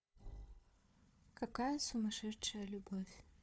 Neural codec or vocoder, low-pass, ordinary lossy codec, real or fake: codec, 16 kHz, 4 kbps, FunCodec, trained on Chinese and English, 50 frames a second; none; none; fake